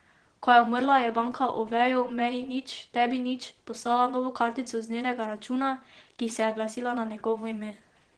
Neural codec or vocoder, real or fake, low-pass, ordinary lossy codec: vocoder, 22.05 kHz, 80 mel bands, Vocos; fake; 9.9 kHz; Opus, 16 kbps